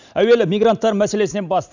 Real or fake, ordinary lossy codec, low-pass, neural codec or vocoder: real; none; 7.2 kHz; none